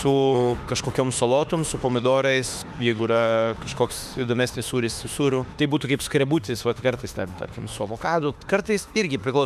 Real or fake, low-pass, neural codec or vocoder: fake; 14.4 kHz; autoencoder, 48 kHz, 32 numbers a frame, DAC-VAE, trained on Japanese speech